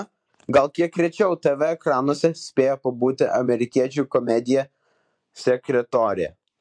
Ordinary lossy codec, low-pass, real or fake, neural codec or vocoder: MP3, 64 kbps; 9.9 kHz; fake; vocoder, 24 kHz, 100 mel bands, Vocos